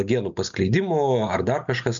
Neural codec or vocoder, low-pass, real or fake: none; 7.2 kHz; real